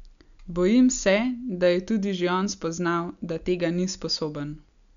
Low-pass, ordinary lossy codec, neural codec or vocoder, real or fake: 7.2 kHz; none; none; real